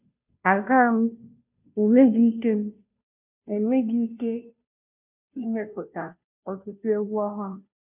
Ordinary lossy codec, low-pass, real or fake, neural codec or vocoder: none; 3.6 kHz; fake; codec, 16 kHz, 0.5 kbps, FunCodec, trained on Chinese and English, 25 frames a second